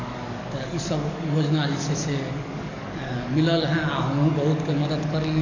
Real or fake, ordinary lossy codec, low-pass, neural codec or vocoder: fake; none; 7.2 kHz; codec, 44.1 kHz, 7.8 kbps, DAC